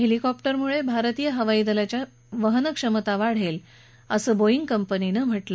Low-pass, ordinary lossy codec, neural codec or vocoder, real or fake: none; none; none; real